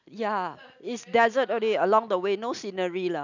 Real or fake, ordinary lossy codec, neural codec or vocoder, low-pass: real; none; none; 7.2 kHz